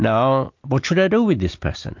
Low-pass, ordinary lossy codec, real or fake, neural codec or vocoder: 7.2 kHz; MP3, 48 kbps; real; none